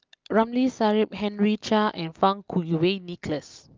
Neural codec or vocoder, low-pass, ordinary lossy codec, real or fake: none; 7.2 kHz; Opus, 24 kbps; real